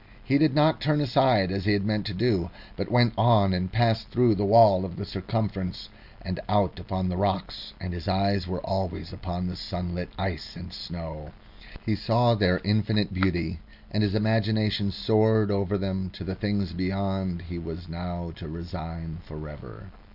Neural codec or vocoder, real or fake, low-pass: none; real; 5.4 kHz